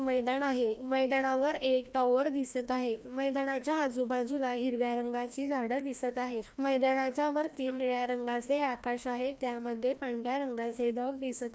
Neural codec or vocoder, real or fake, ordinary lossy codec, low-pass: codec, 16 kHz, 1 kbps, FreqCodec, larger model; fake; none; none